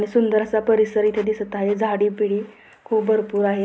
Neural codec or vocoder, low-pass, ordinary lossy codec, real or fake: none; none; none; real